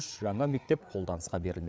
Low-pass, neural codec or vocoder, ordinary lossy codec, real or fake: none; codec, 16 kHz, 8 kbps, FreqCodec, larger model; none; fake